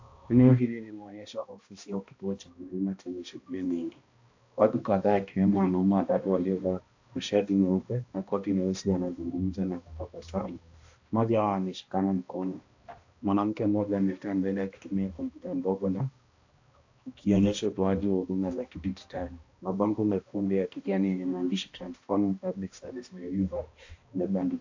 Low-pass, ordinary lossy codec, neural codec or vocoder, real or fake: 7.2 kHz; AAC, 48 kbps; codec, 16 kHz, 1 kbps, X-Codec, HuBERT features, trained on balanced general audio; fake